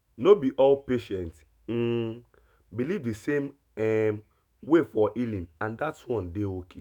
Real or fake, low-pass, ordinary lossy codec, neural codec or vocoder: fake; 19.8 kHz; none; autoencoder, 48 kHz, 128 numbers a frame, DAC-VAE, trained on Japanese speech